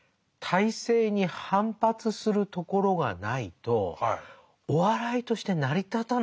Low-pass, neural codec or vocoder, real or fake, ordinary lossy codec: none; none; real; none